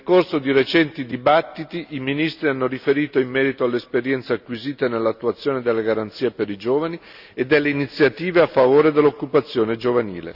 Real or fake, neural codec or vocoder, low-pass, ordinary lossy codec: real; none; 5.4 kHz; none